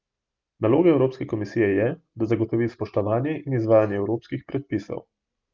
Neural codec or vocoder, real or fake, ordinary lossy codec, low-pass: none; real; Opus, 24 kbps; 7.2 kHz